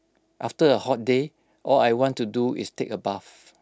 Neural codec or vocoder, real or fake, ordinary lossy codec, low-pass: none; real; none; none